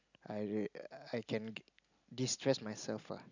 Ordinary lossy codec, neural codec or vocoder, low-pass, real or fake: none; none; 7.2 kHz; real